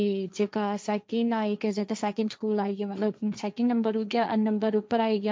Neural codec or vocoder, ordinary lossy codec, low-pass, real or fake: codec, 16 kHz, 1.1 kbps, Voila-Tokenizer; none; none; fake